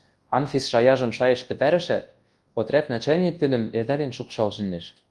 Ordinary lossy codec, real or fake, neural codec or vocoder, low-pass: Opus, 24 kbps; fake; codec, 24 kHz, 0.9 kbps, WavTokenizer, large speech release; 10.8 kHz